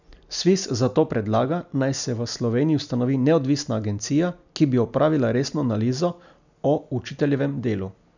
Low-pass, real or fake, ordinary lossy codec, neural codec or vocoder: 7.2 kHz; real; none; none